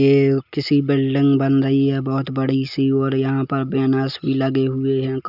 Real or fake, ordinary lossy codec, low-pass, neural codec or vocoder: real; none; 5.4 kHz; none